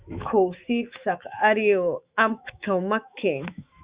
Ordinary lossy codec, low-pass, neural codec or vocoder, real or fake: Opus, 64 kbps; 3.6 kHz; autoencoder, 48 kHz, 128 numbers a frame, DAC-VAE, trained on Japanese speech; fake